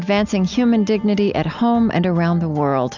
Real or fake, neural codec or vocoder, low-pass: real; none; 7.2 kHz